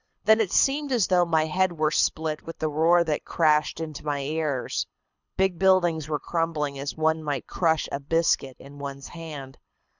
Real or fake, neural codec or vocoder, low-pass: fake; codec, 24 kHz, 6 kbps, HILCodec; 7.2 kHz